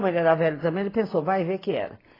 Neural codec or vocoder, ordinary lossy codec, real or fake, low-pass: none; AAC, 24 kbps; real; 5.4 kHz